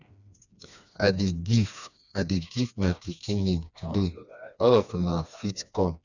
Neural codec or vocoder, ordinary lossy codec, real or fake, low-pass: codec, 16 kHz, 2 kbps, FreqCodec, smaller model; none; fake; 7.2 kHz